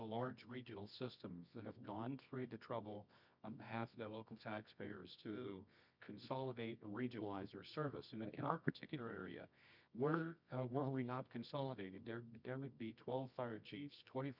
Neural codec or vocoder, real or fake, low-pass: codec, 24 kHz, 0.9 kbps, WavTokenizer, medium music audio release; fake; 5.4 kHz